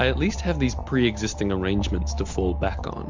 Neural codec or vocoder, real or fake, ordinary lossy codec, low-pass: none; real; MP3, 64 kbps; 7.2 kHz